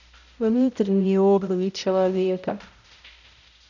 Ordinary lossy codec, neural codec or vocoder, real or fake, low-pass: none; codec, 16 kHz, 0.5 kbps, X-Codec, HuBERT features, trained on balanced general audio; fake; 7.2 kHz